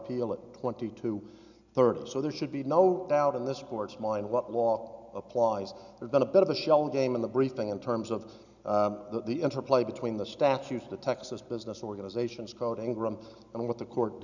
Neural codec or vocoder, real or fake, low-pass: none; real; 7.2 kHz